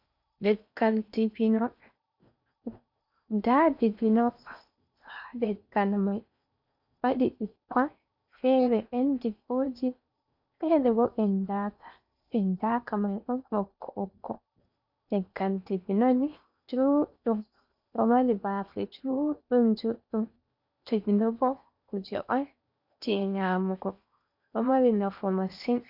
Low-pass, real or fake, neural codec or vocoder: 5.4 kHz; fake; codec, 16 kHz in and 24 kHz out, 0.8 kbps, FocalCodec, streaming, 65536 codes